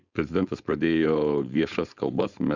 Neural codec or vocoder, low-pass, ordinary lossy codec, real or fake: codec, 16 kHz, 4.8 kbps, FACodec; 7.2 kHz; Opus, 64 kbps; fake